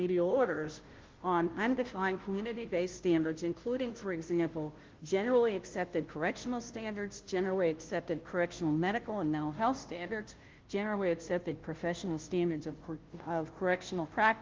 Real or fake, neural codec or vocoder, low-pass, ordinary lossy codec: fake; codec, 16 kHz, 0.5 kbps, FunCodec, trained on Chinese and English, 25 frames a second; 7.2 kHz; Opus, 16 kbps